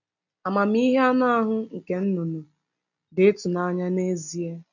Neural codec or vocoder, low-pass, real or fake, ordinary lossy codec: none; 7.2 kHz; real; none